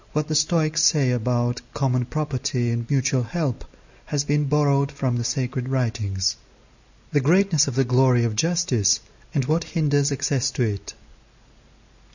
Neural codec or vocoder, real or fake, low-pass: none; real; 7.2 kHz